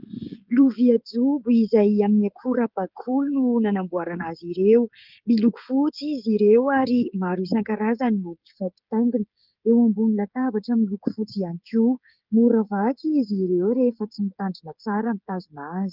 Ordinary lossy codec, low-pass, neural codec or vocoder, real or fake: Opus, 32 kbps; 5.4 kHz; codec, 16 kHz, 16 kbps, FreqCodec, smaller model; fake